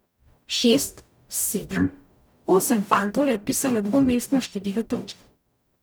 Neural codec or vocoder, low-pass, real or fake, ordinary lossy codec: codec, 44.1 kHz, 0.9 kbps, DAC; none; fake; none